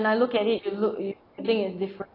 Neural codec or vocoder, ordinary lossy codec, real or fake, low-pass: none; AAC, 24 kbps; real; 5.4 kHz